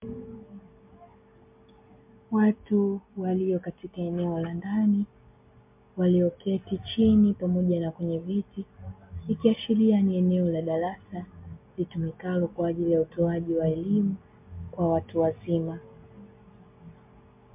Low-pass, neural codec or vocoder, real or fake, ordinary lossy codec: 3.6 kHz; none; real; MP3, 32 kbps